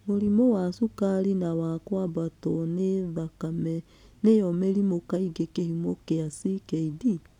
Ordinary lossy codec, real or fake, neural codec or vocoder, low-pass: none; real; none; 19.8 kHz